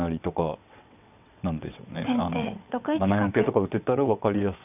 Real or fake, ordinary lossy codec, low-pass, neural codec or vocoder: fake; none; 3.6 kHz; vocoder, 22.05 kHz, 80 mel bands, WaveNeXt